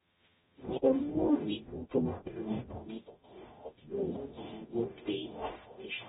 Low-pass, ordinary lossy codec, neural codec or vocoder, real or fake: 19.8 kHz; AAC, 16 kbps; codec, 44.1 kHz, 0.9 kbps, DAC; fake